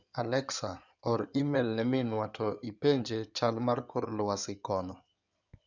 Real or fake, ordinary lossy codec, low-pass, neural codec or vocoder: fake; none; 7.2 kHz; codec, 16 kHz in and 24 kHz out, 2.2 kbps, FireRedTTS-2 codec